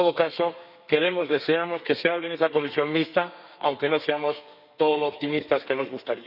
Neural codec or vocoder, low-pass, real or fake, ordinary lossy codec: codec, 32 kHz, 1.9 kbps, SNAC; 5.4 kHz; fake; none